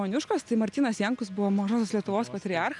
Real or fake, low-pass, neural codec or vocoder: real; 10.8 kHz; none